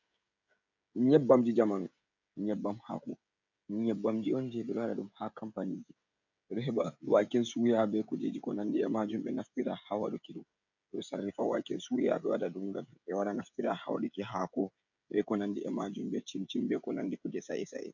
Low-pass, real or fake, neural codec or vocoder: 7.2 kHz; fake; codec, 16 kHz, 16 kbps, FreqCodec, smaller model